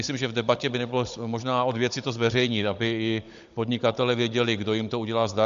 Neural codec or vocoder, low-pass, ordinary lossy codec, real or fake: codec, 16 kHz, 16 kbps, FunCodec, trained on Chinese and English, 50 frames a second; 7.2 kHz; MP3, 64 kbps; fake